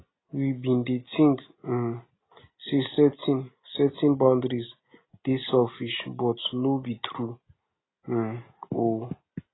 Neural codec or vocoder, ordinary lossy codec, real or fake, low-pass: none; AAC, 16 kbps; real; 7.2 kHz